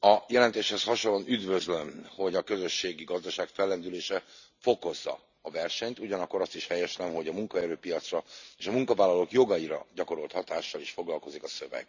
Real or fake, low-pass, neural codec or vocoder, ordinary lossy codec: real; 7.2 kHz; none; none